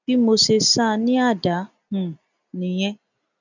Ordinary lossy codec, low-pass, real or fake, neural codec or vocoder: none; 7.2 kHz; real; none